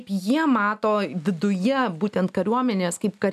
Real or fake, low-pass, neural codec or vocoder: real; 14.4 kHz; none